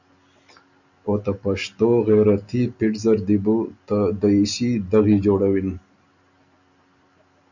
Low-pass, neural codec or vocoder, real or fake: 7.2 kHz; none; real